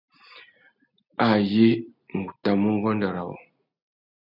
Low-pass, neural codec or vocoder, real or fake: 5.4 kHz; none; real